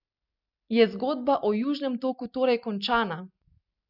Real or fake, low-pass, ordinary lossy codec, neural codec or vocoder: real; 5.4 kHz; none; none